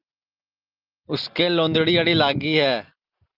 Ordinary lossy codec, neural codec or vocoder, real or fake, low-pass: Opus, 24 kbps; none; real; 5.4 kHz